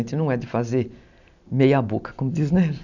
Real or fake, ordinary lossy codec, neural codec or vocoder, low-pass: real; none; none; 7.2 kHz